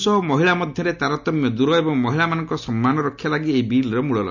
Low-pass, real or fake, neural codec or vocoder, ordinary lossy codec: 7.2 kHz; real; none; none